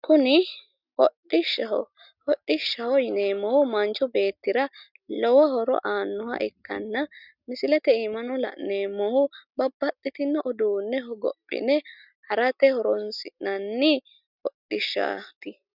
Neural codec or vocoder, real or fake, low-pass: none; real; 5.4 kHz